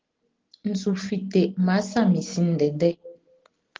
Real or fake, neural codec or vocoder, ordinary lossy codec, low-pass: real; none; Opus, 16 kbps; 7.2 kHz